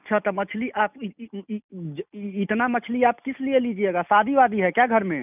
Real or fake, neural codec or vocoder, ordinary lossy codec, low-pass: real; none; none; 3.6 kHz